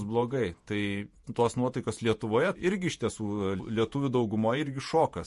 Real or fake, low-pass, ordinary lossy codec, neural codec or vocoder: fake; 14.4 kHz; MP3, 48 kbps; vocoder, 48 kHz, 128 mel bands, Vocos